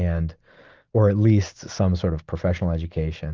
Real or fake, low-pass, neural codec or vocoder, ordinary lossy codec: real; 7.2 kHz; none; Opus, 32 kbps